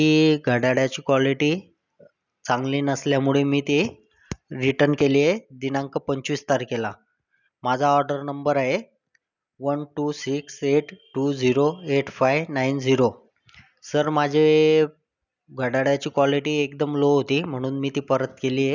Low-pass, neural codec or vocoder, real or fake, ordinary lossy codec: 7.2 kHz; none; real; none